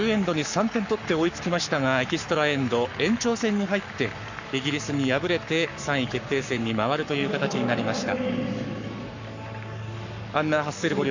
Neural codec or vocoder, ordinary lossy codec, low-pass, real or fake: codec, 44.1 kHz, 7.8 kbps, Pupu-Codec; none; 7.2 kHz; fake